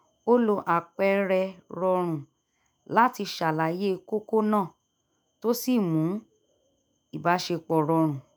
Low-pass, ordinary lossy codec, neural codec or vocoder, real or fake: none; none; autoencoder, 48 kHz, 128 numbers a frame, DAC-VAE, trained on Japanese speech; fake